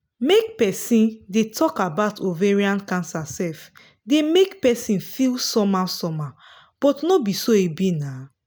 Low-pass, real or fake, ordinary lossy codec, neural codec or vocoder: none; real; none; none